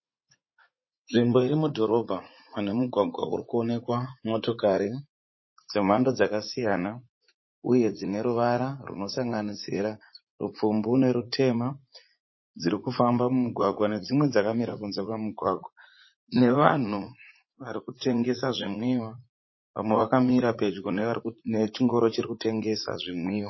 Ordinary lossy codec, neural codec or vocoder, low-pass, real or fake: MP3, 24 kbps; vocoder, 44.1 kHz, 80 mel bands, Vocos; 7.2 kHz; fake